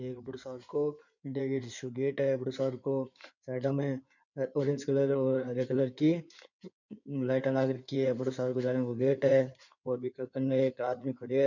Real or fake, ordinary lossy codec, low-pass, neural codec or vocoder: fake; none; 7.2 kHz; codec, 16 kHz in and 24 kHz out, 2.2 kbps, FireRedTTS-2 codec